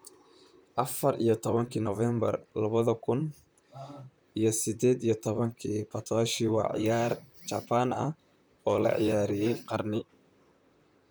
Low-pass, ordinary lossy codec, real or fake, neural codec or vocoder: none; none; fake; vocoder, 44.1 kHz, 128 mel bands, Pupu-Vocoder